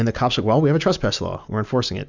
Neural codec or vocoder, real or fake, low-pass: none; real; 7.2 kHz